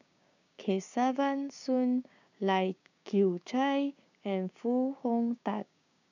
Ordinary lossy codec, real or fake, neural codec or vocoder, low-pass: none; fake; codec, 16 kHz, 6 kbps, DAC; 7.2 kHz